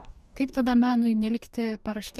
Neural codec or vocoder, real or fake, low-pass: codec, 44.1 kHz, 2.6 kbps, DAC; fake; 14.4 kHz